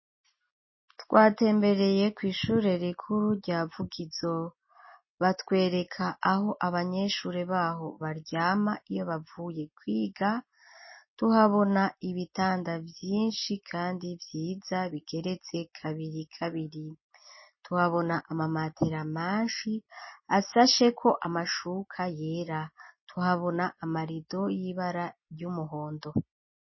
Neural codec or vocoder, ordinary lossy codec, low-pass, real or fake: none; MP3, 24 kbps; 7.2 kHz; real